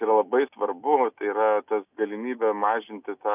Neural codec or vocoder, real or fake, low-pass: none; real; 3.6 kHz